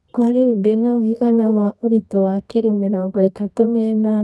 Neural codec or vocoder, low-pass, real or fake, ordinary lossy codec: codec, 24 kHz, 0.9 kbps, WavTokenizer, medium music audio release; none; fake; none